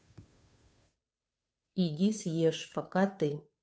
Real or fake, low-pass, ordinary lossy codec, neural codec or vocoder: fake; none; none; codec, 16 kHz, 2 kbps, FunCodec, trained on Chinese and English, 25 frames a second